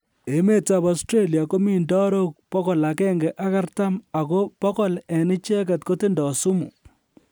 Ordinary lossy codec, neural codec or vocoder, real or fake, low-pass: none; none; real; none